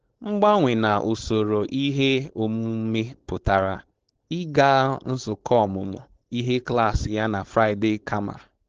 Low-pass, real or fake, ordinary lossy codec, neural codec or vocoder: 7.2 kHz; fake; Opus, 16 kbps; codec, 16 kHz, 4.8 kbps, FACodec